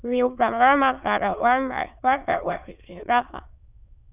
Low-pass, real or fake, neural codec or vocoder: 3.6 kHz; fake; autoencoder, 22.05 kHz, a latent of 192 numbers a frame, VITS, trained on many speakers